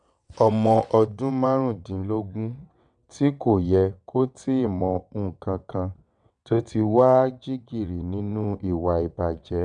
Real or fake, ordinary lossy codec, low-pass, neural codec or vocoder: fake; none; 9.9 kHz; vocoder, 22.05 kHz, 80 mel bands, WaveNeXt